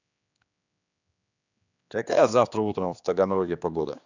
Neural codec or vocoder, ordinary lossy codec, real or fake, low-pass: codec, 16 kHz, 2 kbps, X-Codec, HuBERT features, trained on general audio; none; fake; 7.2 kHz